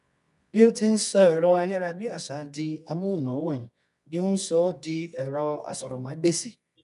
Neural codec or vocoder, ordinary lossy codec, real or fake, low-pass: codec, 24 kHz, 0.9 kbps, WavTokenizer, medium music audio release; none; fake; 10.8 kHz